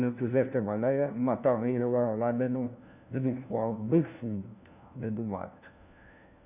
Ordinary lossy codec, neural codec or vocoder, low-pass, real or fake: none; codec, 16 kHz, 1 kbps, FunCodec, trained on LibriTTS, 50 frames a second; 3.6 kHz; fake